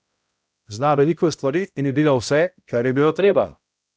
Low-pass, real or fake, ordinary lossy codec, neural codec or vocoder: none; fake; none; codec, 16 kHz, 0.5 kbps, X-Codec, HuBERT features, trained on balanced general audio